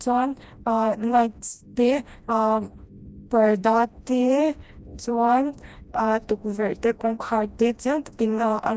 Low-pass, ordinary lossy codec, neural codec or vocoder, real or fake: none; none; codec, 16 kHz, 1 kbps, FreqCodec, smaller model; fake